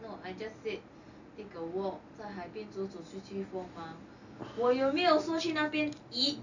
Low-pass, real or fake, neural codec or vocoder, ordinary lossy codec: 7.2 kHz; real; none; none